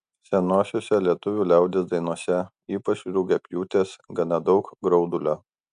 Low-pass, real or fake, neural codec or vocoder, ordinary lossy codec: 9.9 kHz; real; none; MP3, 96 kbps